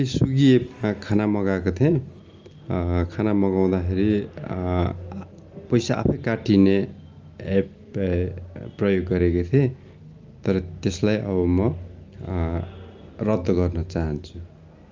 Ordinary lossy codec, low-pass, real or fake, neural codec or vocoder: Opus, 32 kbps; 7.2 kHz; real; none